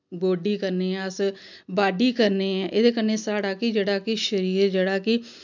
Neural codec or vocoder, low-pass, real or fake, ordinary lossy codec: none; 7.2 kHz; real; none